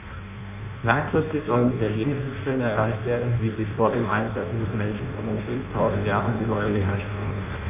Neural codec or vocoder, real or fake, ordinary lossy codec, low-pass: codec, 16 kHz in and 24 kHz out, 0.6 kbps, FireRedTTS-2 codec; fake; none; 3.6 kHz